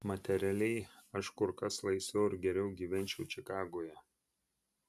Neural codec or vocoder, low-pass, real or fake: none; 14.4 kHz; real